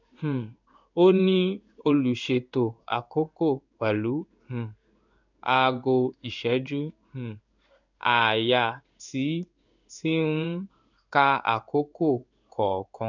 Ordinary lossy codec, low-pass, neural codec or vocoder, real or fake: none; 7.2 kHz; codec, 16 kHz in and 24 kHz out, 1 kbps, XY-Tokenizer; fake